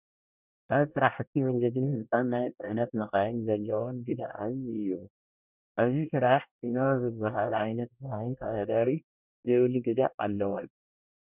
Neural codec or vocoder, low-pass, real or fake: codec, 24 kHz, 1 kbps, SNAC; 3.6 kHz; fake